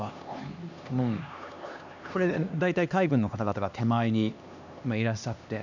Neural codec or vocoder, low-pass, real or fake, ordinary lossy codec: codec, 16 kHz, 2 kbps, X-Codec, HuBERT features, trained on LibriSpeech; 7.2 kHz; fake; none